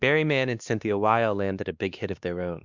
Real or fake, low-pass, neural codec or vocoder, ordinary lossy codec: fake; 7.2 kHz; codec, 16 kHz, 2 kbps, X-Codec, WavLM features, trained on Multilingual LibriSpeech; Opus, 64 kbps